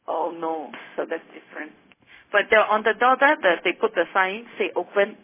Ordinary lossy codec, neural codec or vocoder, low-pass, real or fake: MP3, 16 kbps; codec, 16 kHz, 0.4 kbps, LongCat-Audio-Codec; 3.6 kHz; fake